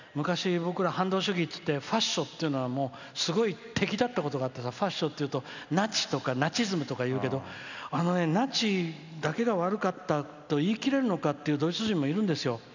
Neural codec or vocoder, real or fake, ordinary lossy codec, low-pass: none; real; none; 7.2 kHz